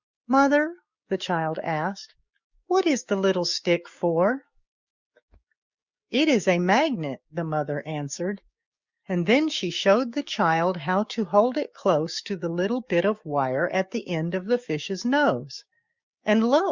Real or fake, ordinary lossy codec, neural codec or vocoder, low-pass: fake; Opus, 64 kbps; codec, 16 kHz, 6 kbps, DAC; 7.2 kHz